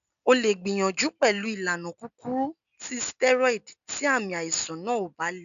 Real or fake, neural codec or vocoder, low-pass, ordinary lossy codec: real; none; 7.2 kHz; none